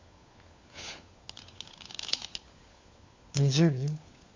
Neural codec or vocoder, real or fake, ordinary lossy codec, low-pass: codec, 16 kHz, 4 kbps, FunCodec, trained on LibriTTS, 50 frames a second; fake; MP3, 64 kbps; 7.2 kHz